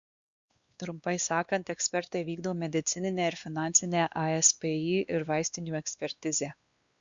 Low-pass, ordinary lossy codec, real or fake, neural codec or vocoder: 7.2 kHz; Opus, 64 kbps; fake; codec, 16 kHz, 2 kbps, X-Codec, WavLM features, trained on Multilingual LibriSpeech